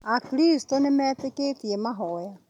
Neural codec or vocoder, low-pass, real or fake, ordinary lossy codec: none; 19.8 kHz; real; none